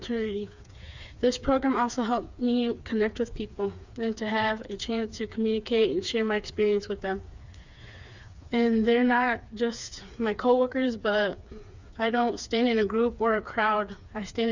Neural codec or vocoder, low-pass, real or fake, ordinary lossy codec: codec, 16 kHz, 4 kbps, FreqCodec, smaller model; 7.2 kHz; fake; Opus, 64 kbps